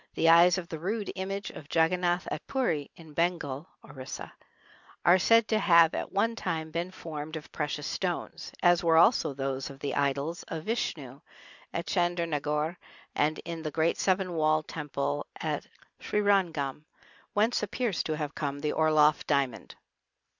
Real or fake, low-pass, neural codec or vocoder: real; 7.2 kHz; none